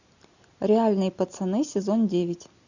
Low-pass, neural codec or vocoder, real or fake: 7.2 kHz; none; real